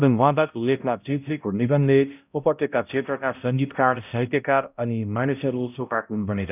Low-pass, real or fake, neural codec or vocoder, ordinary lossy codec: 3.6 kHz; fake; codec, 16 kHz, 0.5 kbps, X-Codec, HuBERT features, trained on balanced general audio; none